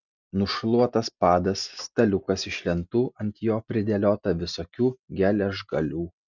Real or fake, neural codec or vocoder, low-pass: real; none; 7.2 kHz